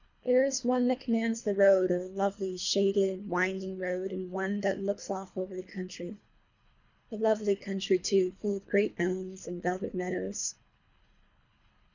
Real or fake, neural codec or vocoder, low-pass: fake; codec, 24 kHz, 3 kbps, HILCodec; 7.2 kHz